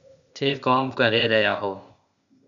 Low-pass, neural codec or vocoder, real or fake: 7.2 kHz; codec, 16 kHz, 0.8 kbps, ZipCodec; fake